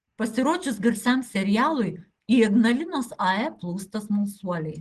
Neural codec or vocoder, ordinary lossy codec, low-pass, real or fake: none; Opus, 16 kbps; 10.8 kHz; real